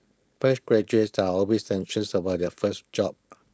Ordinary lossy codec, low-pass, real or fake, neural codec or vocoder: none; none; fake; codec, 16 kHz, 4.8 kbps, FACodec